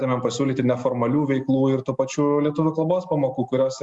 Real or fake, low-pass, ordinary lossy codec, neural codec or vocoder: real; 10.8 kHz; Opus, 64 kbps; none